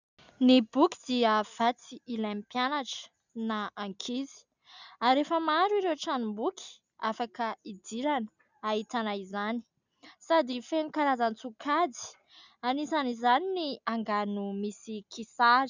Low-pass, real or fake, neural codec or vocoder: 7.2 kHz; real; none